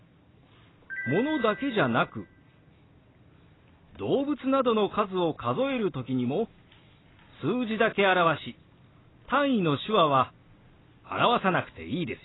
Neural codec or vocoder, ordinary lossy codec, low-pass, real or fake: none; AAC, 16 kbps; 7.2 kHz; real